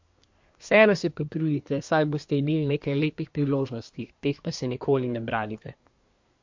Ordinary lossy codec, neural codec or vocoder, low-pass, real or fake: MP3, 48 kbps; codec, 24 kHz, 1 kbps, SNAC; 7.2 kHz; fake